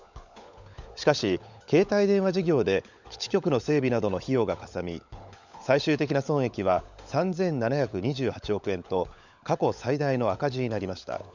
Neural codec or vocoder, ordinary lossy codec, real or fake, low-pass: codec, 16 kHz, 16 kbps, FunCodec, trained on LibriTTS, 50 frames a second; none; fake; 7.2 kHz